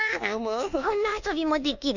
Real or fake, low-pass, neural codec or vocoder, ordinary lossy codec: fake; 7.2 kHz; codec, 24 kHz, 1.2 kbps, DualCodec; none